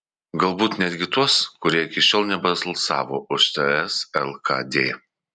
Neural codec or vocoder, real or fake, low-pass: none; real; 9.9 kHz